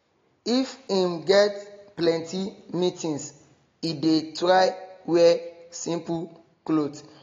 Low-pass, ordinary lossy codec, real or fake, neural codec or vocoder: 7.2 kHz; AAC, 32 kbps; real; none